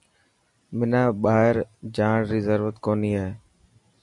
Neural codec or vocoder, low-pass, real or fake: none; 10.8 kHz; real